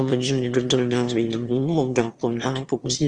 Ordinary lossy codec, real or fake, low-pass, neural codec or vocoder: Opus, 24 kbps; fake; 9.9 kHz; autoencoder, 22.05 kHz, a latent of 192 numbers a frame, VITS, trained on one speaker